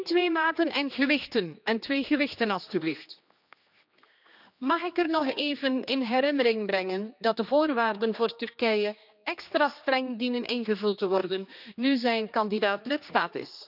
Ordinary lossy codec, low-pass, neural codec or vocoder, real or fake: none; 5.4 kHz; codec, 16 kHz, 2 kbps, X-Codec, HuBERT features, trained on general audio; fake